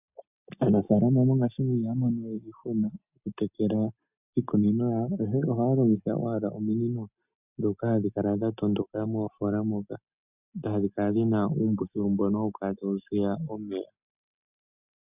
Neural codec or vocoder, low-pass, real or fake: none; 3.6 kHz; real